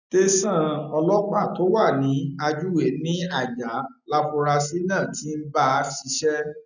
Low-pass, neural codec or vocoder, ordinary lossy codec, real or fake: 7.2 kHz; none; none; real